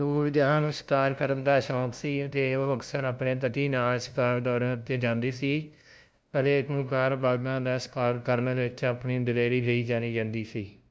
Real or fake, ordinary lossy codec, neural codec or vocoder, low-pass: fake; none; codec, 16 kHz, 0.5 kbps, FunCodec, trained on LibriTTS, 25 frames a second; none